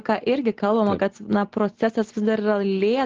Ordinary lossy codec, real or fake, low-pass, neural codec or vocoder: Opus, 16 kbps; real; 7.2 kHz; none